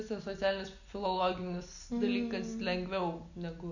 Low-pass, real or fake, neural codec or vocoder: 7.2 kHz; real; none